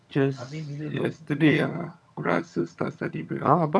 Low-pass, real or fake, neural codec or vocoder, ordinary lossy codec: none; fake; vocoder, 22.05 kHz, 80 mel bands, HiFi-GAN; none